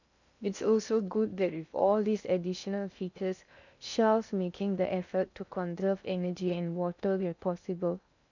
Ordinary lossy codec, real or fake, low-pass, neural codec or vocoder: none; fake; 7.2 kHz; codec, 16 kHz in and 24 kHz out, 0.6 kbps, FocalCodec, streaming, 2048 codes